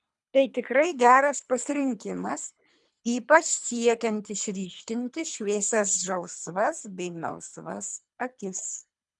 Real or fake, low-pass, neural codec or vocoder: fake; 10.8 kHz; codec, 24 kHz, 3 kbps, HILCodec